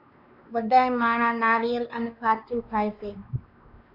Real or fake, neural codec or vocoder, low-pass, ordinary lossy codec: fake; codec, 16 kHz, 2 kbps, X-Codec, WavLM features, trained on Multilingual LibriSpeech; 5.4 kHz; MP3, 48 kbps